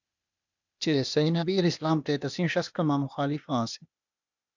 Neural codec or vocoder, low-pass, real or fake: codec, 16 kHz, 0.8 kbps, ZipCodec; 7.2 kHz; fake